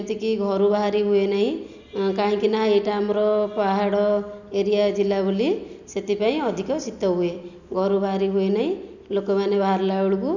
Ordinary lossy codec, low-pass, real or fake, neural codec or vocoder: none; 7.2 kHz; real; none